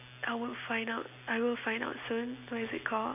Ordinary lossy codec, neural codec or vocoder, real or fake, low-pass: none; none; real; 3.6 kHz